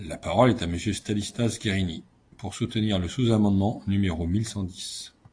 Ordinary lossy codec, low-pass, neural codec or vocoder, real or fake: AAC, 48 kbps; 9.9 kHz; none; real